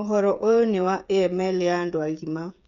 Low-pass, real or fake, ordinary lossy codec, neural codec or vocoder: 7.2 kHz; fake; none; codec, 16 kHz, 8 kbps, FreqCodec, smaller model